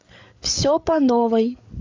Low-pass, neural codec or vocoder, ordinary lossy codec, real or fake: 7.2 kHz; codec, 16 kHz, 8 kbps, FreqCodec, larger model; AAC, 48 kbps; fake